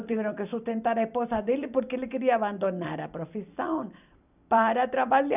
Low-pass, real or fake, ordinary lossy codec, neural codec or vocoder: 3.6 kHz; fake; none; vocoder, 44.1 kHz, 128 mel bands every 256 samples, BigVGAN v2